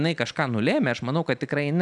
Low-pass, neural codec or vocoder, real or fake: 10.8 kHz; none; real